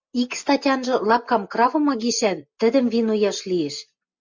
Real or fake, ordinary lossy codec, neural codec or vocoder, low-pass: real; MP3, 64 kbps; none; 7.2 kHz